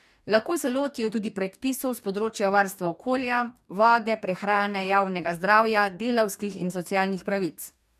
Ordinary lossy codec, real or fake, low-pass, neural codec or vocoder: none; fake; 14.4 kHz; codec, 44.1 kHz, 2.6 kbps, DAC